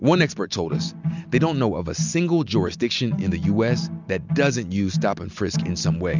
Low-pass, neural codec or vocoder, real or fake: 7.2 kHz; none; real